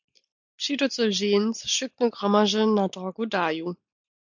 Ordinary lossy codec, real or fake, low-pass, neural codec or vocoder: MP3, 64 kbps; real; 7.2 kHz; none